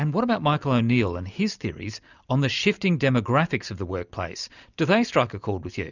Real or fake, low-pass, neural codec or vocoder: real; 7.2 kHz; none